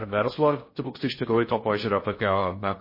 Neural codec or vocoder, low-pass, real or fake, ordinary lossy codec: codec, 16 kHz in and 24 kHz out, 0.6 kbps, FocalCodec, streaming, 2048 codes; 5.4 kHz; fake; MP3, 24 kbps